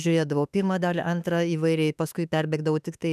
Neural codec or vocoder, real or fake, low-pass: autoencoder, 48 kHz, 32 numbers a frame, DAC-VAE, trained on Japanese speech; fake; 14.4 kHz